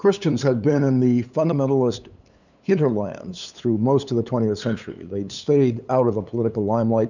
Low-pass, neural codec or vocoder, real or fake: 7.2 kHz; codec, 16 kHz, 8 kbps, FunCodec, trained on LibriTTS, 25 frames a second; fake